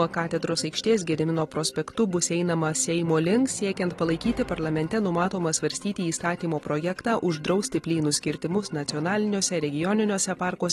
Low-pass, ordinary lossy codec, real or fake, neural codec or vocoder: 19.8 kHz; AAC, 32 kbps; real; none